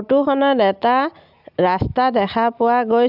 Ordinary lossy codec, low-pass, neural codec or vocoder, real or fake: none; 5.4 kHz; none; real